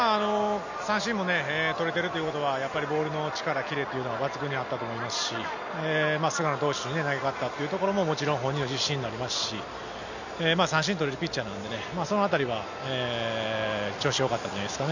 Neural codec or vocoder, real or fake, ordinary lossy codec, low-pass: none; real; none; 7.2 kHz